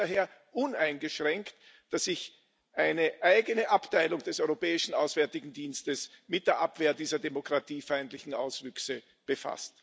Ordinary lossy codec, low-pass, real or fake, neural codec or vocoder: none; none; real; none